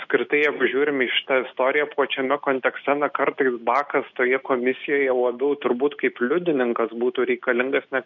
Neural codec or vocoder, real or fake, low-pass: none; real; 7.2 kHz